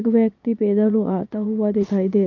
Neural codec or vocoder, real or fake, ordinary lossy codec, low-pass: vocoder, 22.05 kHz, 80 mel bands, Vocos; fake; none; 7.2 kHz